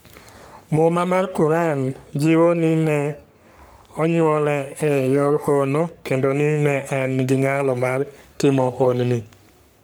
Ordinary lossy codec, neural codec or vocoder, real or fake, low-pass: none; codec, 44.1 kHz, 3.4 kbps, Pupu-Codec; fake; none